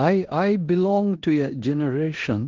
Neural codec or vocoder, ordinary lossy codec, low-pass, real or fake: codec, 16 kHz in and 24 kHz out, 0.9 kbps, LongCat-Audio-Codec, four codebook decoder; Opus, 16 kbps; 7.2 kHz; fake